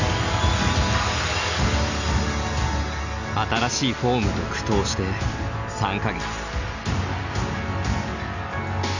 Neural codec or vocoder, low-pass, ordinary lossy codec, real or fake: none; 7.2 kHz; none; real